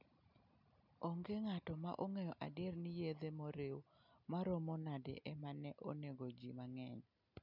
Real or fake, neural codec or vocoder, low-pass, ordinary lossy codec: real; none; 5.4 kHz; none